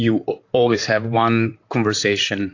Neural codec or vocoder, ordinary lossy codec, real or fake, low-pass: vocoder, 44.1 kHz, 128 mel bands, Pupu-Vocoder; AAC, 48 kbps; fake; 7.2 kHz